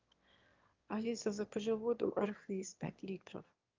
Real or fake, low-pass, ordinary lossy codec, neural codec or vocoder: fake; 7.2 kHz; Opus, 24 kbps; autoencoder, 22.05 kHz, a latent of 192 numbers a frame, VITS, trained on one speaker